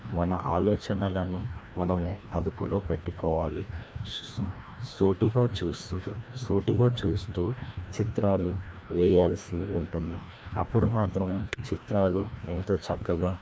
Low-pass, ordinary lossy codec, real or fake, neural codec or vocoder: none; none; fake; codec, 16 kHz, 1 kbps, FreqCodec, larger model